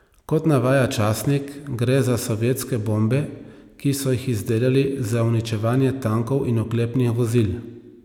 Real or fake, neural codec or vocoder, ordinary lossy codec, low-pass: real; none; none; 19.8 kHz